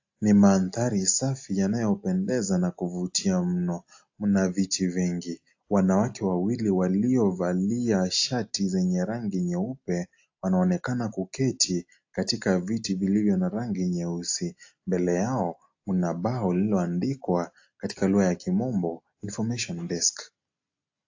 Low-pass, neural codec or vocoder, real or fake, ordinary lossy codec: 7.2 kHz; none; real; AAC, 48 kbps